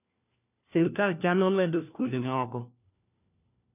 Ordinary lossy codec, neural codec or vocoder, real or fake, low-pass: none; codec, 16 kHz, 1 kbps, FunCodec, trained on LibriTTS, 50 frames a second; fake; 3.6 kHz